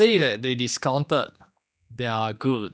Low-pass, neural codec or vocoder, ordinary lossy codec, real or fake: none; codec, 16 kHz, 2 kbps, X-Codec, HuBERT features, trained on general audio; none; fake